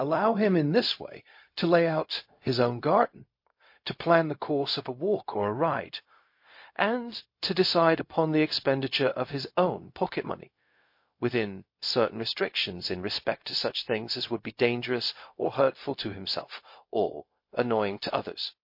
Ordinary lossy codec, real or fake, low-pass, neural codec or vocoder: MP3, 32 kbps; fake; 5.4 kHz; codec, 16 kHz, 0.4 kbps, LongCat-Audio-Codec